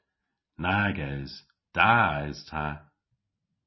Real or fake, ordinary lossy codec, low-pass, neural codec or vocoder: real; MP3, 24 kbps; 7.2 kHz; none